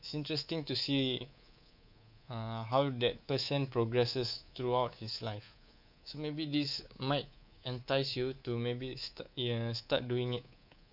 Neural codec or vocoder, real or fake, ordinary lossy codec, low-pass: codec, 24 kHz, 3.1 kbps, DualCodec; fake; none; 5.4 kHz